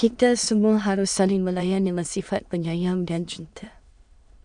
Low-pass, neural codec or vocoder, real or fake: 9.9 kHz; autoencoder, 22.05 kHz, a latent of 192 numbers a frame, VITS, trained on many speakers; fake